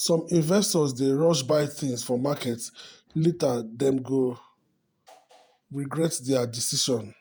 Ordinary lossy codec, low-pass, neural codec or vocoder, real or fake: none; none; vocoder, 48 kHz, 128 mel bands, Vocos; fake